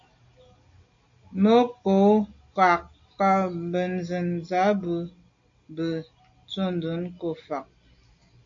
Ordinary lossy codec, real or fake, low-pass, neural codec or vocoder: MP3, 48 kbps; real; 7.2 kHz; none